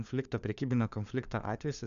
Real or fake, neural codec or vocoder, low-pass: fake; codec, 16 kHz, 4 kbps, FunCodec, trained on LibriTTS, 50 frames a second; 7.2 kHz